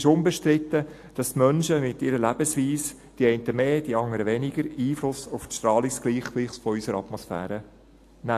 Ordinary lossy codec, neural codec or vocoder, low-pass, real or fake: AAC, 64 kbps; none; 14.4 kHz; real